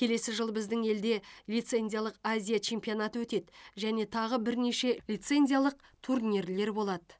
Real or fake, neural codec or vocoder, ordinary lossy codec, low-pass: real; none; none; none